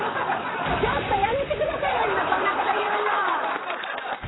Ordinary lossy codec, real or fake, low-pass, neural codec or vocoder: AAC, 16 kbps; fake; 7.2 kHz; vocoder, 44.1 kHz, 128 mel bands, Pupu-Vocoder